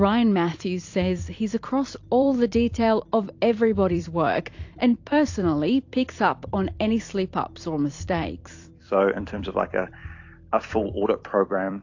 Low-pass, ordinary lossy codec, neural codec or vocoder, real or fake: 7.2 kHz; AAC, 48 kbps; vocoder, 44.1 kHz, 128 mel bands every 256 samples, BigVGAN v2; fake